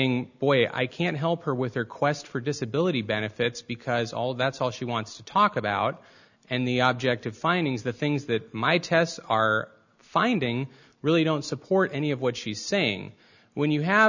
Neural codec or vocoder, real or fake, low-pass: none; real; 7.2 kHz